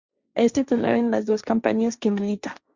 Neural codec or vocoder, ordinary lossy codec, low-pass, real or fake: codec, 16 kHz, 1.1 kbps, Voila-Tokenizer; Opus, 64 kbps; 7.2 kHz; fake